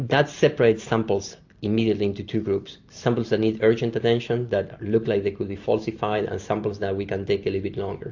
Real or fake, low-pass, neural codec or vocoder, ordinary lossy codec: real; 7.2 kHz; none; AAC, 48 kbps